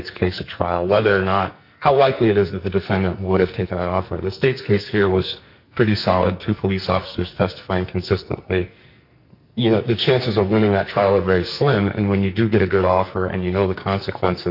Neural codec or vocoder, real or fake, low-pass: codec, 44.1 kHz, 2.6 kbps, SNAC; fake; 5.4 kHz